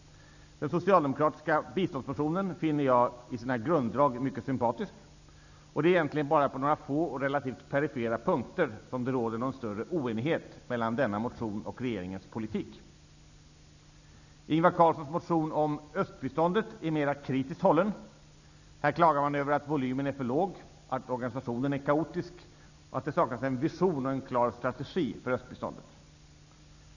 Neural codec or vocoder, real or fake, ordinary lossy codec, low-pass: none; real; none; 7.2 kHz